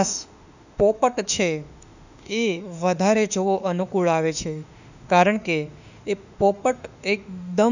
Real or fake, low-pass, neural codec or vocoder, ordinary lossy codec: fake; 7.2 kHz; autoencoder, 48 kHz, 32 numbers a frame, DAC-VAE, trained on Japanese speech; none